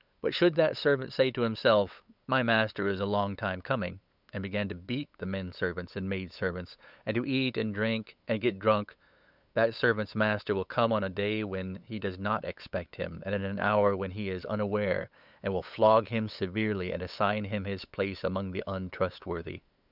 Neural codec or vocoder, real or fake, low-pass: codec, 16 kHz, 8 kbps, FunCodec, trained on LibriTTS, 25 frames a second; fake; 5.4 kHz